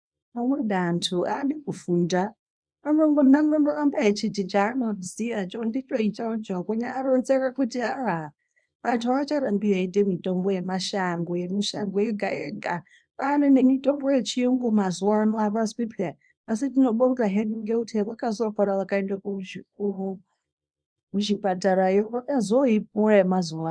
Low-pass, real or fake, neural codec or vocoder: 9.9 kHz; fake; codec, 24 kHz, 0.9 kbps, WavTokenizer, small release